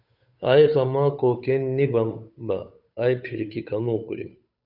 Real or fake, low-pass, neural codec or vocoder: fake; 5.4 kHz; codec, 16 kHz, 8 kbps, FunCodec, trained on Chinese and English, 25 frames a second